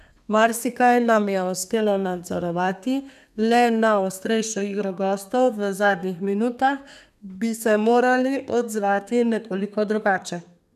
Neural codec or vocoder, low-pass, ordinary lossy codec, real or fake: codec, 32 kHz, 1.9 kbps, SNAC; 14.4 kHz; none; fake